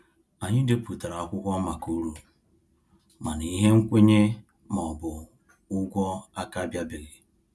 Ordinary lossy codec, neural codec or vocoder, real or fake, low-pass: none; none; real; none